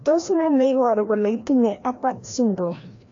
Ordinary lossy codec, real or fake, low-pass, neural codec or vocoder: AAC, 48 kbps; fake; 7.2 kHz; codec, 16 kHz, 1 kbps, FreqCodec, larger model